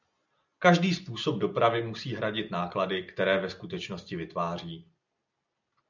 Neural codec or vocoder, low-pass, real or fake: none; 7.2 kHz; real